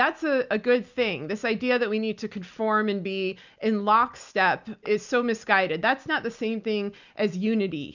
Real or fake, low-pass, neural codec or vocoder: real; 7.2 kHz; none